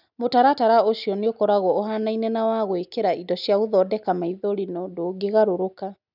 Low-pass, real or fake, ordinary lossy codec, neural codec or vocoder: 5.4 kHz; real; none; none